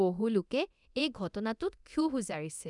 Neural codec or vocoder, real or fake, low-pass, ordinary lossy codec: codec, 24 kHz, 0.9 kbps, DualCodec; fake; 10.8 kHz; none